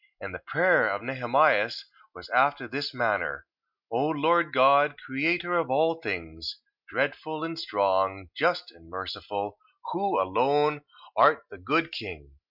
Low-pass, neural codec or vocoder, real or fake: 5.4 kHz; none; real